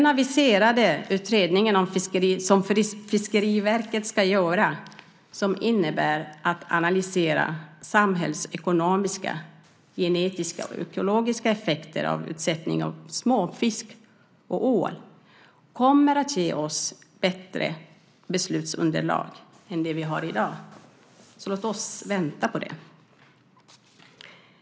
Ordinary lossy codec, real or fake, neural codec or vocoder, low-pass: none; real; none; none